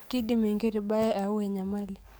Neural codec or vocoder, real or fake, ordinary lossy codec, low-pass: codec, 44.1 kHz, 7.8 kbps, DAC; fake; none; none